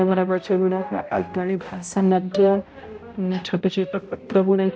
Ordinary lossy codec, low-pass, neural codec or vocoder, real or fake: none; none; codec, 16 kHz, 0.5 kbps, X-Codec, HuBERT features, trained on balanced general audio; fake